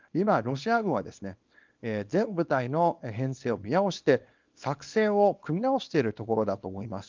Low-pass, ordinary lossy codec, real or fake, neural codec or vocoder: 7.2 kHz; Opus, 24 kbps; fake; codec, 24 kHz, 0.9 kbps, WavTokenizer, small release